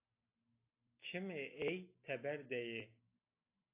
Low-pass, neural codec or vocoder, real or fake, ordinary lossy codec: 3.6 kHz; none; real; AAC, 24 kbps